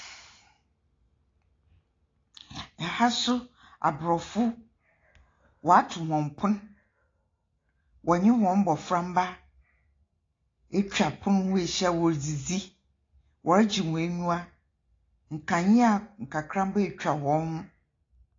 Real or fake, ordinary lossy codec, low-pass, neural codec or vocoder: real; AAC, 32 kbps; 7.2 kHz; none